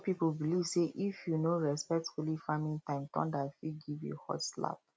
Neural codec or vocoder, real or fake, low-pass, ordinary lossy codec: none; real; none; none